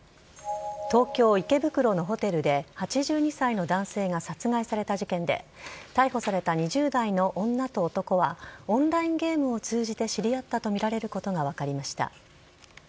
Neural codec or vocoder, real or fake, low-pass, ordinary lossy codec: none; real; none; none